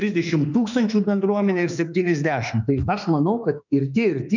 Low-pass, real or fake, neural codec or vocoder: 7.2 kHz; fake; autoencoder, 48 kHz, 32 numbers a frame, DAC-VAE, trained on Japanese speech